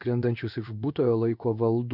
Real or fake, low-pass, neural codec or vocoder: fake; 5.4 kHz; codec, 16 kHz in and 24 kHz out, 1 kbps, XY-Tokenizer